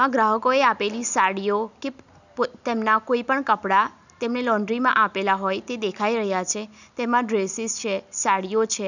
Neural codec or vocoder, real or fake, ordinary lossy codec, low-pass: none; real; none; 7.2 kHz